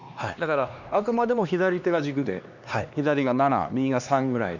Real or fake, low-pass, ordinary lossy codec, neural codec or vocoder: fake; 7.2 kHz; none; codec, 16 kHz, 2 kbps, X-Codec, HuBERT features, trained on LibriSpeech